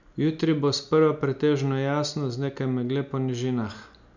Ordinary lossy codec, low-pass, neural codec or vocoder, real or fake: none; 7.2 kHz; none; real